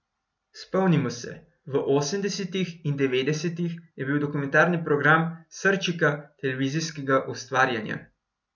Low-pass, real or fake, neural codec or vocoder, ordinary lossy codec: 7.2 kHz; real; none; none